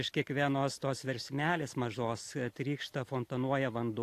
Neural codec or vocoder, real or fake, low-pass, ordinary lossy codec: none; real; 14.4 kHz; AAC, 64 kbps